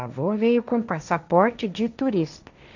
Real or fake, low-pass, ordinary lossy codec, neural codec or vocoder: fake; 7.2 kHz; none; codec, 16 kHz, 1.1 kbps, Voila-Tokenizer